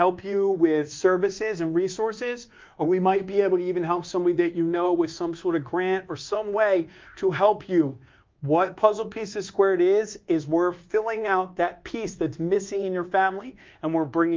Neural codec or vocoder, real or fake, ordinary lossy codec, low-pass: codec, 24 kHz, 1.2 kbps, DualCodec; fake; Opus, 24 kbps; 7.2 kHz